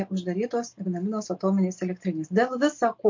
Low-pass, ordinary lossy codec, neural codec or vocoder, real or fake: 7.2 kHz; MP3, 48 kbps; none; real